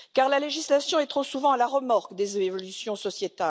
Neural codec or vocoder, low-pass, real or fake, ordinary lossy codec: none; none; real; none